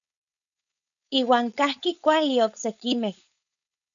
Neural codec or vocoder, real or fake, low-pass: codec, 16 kHz, 4.8 kbps, FACodec; fake; 7.2 kHz